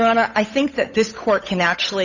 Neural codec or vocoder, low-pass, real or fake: codec, 16 kHz, 8 kbps, FunCodec, trained on Chinese and English, 25 frames a second; 7.2 kHz; fake